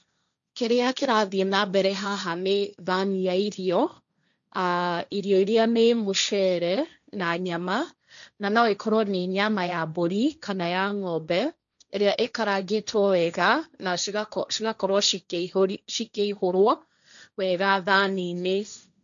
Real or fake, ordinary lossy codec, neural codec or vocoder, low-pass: fake; none; codec, 16 kHz, 1.1 kbps, Voila-Tokenizer; 7.2 kHz